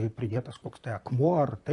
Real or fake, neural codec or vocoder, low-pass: fake; vocoder, 44.1 kHz, 128 mel bands, Pupu-Vocoder; 10.8 kHz